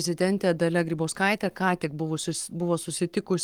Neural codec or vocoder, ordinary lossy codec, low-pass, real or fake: codec, 44.1 kHz, 7.8 kbps, Pupu-Codec; Opus, 24 kbps; 19.8 kHz; fake